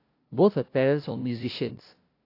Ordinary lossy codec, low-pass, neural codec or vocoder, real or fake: AAC, 32 kbps; 5.4 kHz; codec, 16 kHz, 0.5 kbps, FunCodec, trained on LibriTTS, 25 frames a second; fake